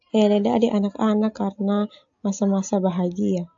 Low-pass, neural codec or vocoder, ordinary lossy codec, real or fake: 7.2 kHz; none; AAC, 48 kbps; real